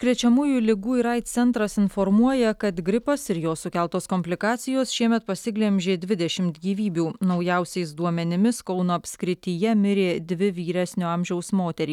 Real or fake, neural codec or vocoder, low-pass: real; none; 19.8 kHz